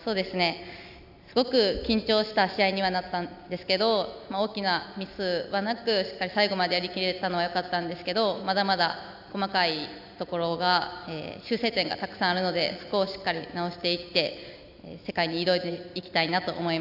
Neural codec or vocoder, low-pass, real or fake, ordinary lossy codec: none; 5.4 kHz; real; none